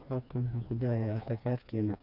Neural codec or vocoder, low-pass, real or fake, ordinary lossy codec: codec, 16 kHz, 2 kbps, FreqCodec, smaller model; 5.4 kHz; fake; none